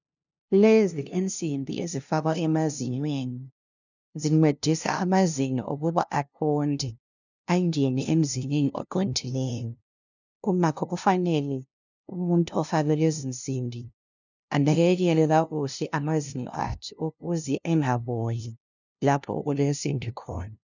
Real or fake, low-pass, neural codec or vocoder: fake; 7.2 kHz; codec, 16 kHz, 0.5 kbps, FunCodec, trained on LibriTTS, 25 frames a second